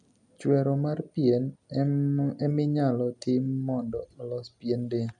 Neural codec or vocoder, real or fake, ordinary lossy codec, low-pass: none; real; none; 10.8 kHz